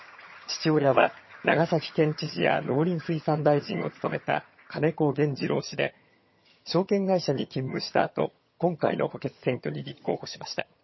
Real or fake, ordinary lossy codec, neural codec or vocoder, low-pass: fake; MP3, 24 kbps; vocoder, 22.05 kHz, 80 mel bands, HiFi-GAN; 7.2 kHz